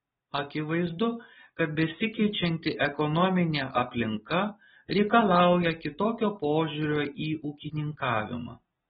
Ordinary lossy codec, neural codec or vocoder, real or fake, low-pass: AAC, 16 kbps; none; real; 19.8 kHz